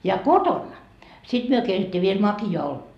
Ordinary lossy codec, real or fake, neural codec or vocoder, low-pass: none; real; none; 14.4 kHz